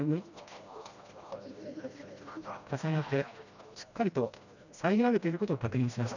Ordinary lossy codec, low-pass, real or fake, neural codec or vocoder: none; 7.2 kHz; fake; codec, 16 kHz, 1 kbps, FreqCodec, smaller model